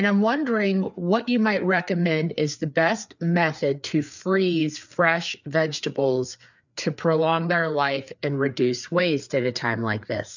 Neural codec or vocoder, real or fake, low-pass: codec, 16 kHz, 4 kbps, FreqCodec, larger model; fake; 7.2 kHz